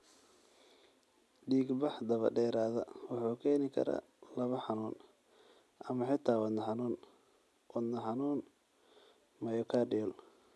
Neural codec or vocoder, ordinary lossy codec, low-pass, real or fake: none; none; none; real